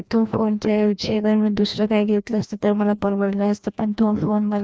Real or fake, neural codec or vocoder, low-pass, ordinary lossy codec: fake; codec, 16 kHz, 1 kbps, FreqCodec, larger model; none; none